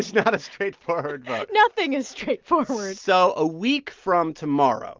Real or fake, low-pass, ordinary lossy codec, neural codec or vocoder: real; 7.2 kHz; Opus, 32 kbps; none